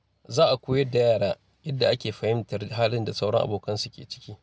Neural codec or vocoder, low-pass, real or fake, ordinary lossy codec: none; none; real; none